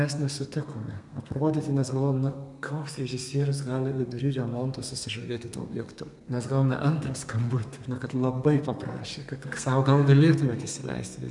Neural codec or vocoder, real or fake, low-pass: codec, 32 kHz, 1.9 kbps, SNAC; fake; 10.8 kHz